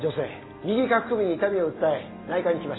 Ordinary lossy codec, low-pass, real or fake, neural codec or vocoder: AAC, 16 kbps; 7.2 kHz; real; none